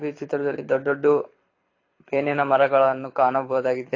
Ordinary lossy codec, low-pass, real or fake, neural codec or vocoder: AAC, 32 kbps; 7.2 kHz; fake; codec, 24 kHz, 6 kbps, HILCodec